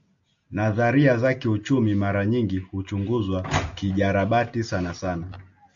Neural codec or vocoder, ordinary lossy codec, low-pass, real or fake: none; AAC, 64 kbps; 7.2 kHz; real